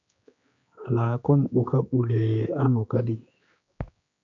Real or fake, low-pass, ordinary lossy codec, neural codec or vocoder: fake; 7.2 kHz; MP3, 64 kbps; codec, 16 kHz, 2 kbps, X-Codec, HuBERT features, trained on general audio